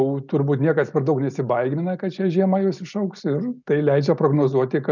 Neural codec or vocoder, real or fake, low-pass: none; real; 7.2 kHz